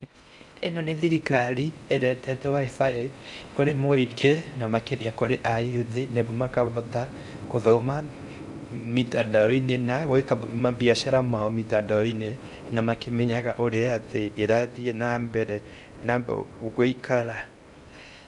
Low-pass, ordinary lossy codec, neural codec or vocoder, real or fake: 10.8 kHz; MP3, 96 kbps; codec, 16 kHz in and 24 kHz out, 0.6 kbps, FocalCodec, streaming, 4096 codes; fake